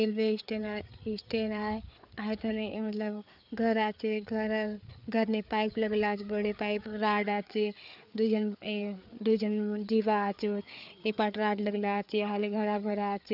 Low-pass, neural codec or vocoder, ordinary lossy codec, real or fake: 5.4 kHz; codec, 16 kHz, 4 kbps, FreqCodec, larger model; none; fake